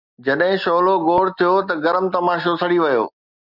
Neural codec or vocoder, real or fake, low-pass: none; real; 5.4 kHz